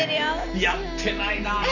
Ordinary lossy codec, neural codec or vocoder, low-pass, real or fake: none; none; 7.2 kHz; real